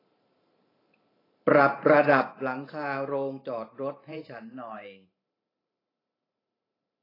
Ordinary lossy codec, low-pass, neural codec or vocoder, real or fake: AAC, 24 kbps; 5.4 kHz; vocoder, 44.1 kHz, 128 mel bands every 512 samples, BigVGAN v2; fake